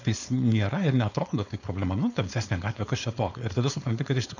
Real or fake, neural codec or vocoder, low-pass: fake; codec, 16 kHz, 4.8 kbps, FACodec; 7.2 kHz